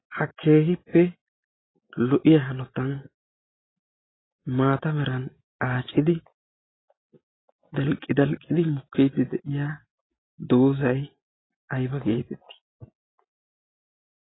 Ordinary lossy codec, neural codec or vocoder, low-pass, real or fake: AAC, 16 kbps; none; 7.2 kHz; real